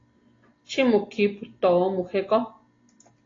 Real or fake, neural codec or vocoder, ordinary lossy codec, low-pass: real; none; AAC, 32 kbps; 7.2 kHz